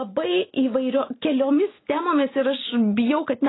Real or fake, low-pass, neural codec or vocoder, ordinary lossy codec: real; 7.2 kHz; none; AAC, 16 kbps